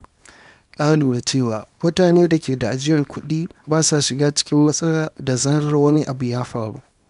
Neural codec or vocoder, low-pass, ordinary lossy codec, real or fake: codec, 24 kHz, 0.9 kbps, WavTokenizer, small release; 10.8 kHz; none; fake